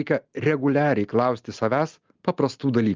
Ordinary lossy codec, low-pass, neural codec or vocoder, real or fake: Opus, 24 kbps; 7.2 kHz; none; real